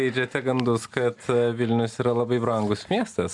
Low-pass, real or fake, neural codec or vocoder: 10.8 kHz; real; none